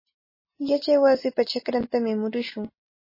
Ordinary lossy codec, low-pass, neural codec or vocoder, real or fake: MP3, 24 kbps; 5.4 kHz; none; real